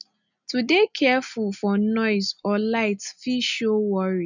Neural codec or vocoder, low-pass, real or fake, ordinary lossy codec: none; 7.2 kHz; real; none